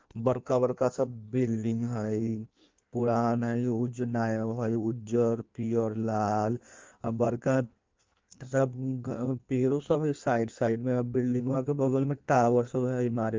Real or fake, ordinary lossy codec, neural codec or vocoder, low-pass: fake; Opus, 32 kbps; codec, 16 kHz in and 24 kHz out, 1.1 kbps, FireRedTTS-2 codec; 7.2 kHz